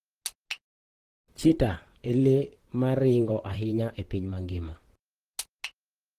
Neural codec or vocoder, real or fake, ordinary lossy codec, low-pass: vocoder, 44.1 kHz, 128 mel bands, Pupu-Vocoder; fake; Opus, 16 kbps; 14.4 kHz